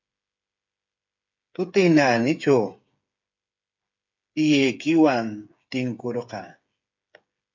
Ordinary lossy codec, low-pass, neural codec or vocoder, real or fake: MP3, 64 kbps; 7.2 kHz; codec, 16 kHz, 8 kbps, FreqCodec, smaller model; fake